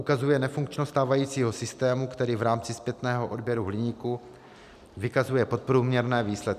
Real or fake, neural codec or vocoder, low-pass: real; none; 14.4 kHz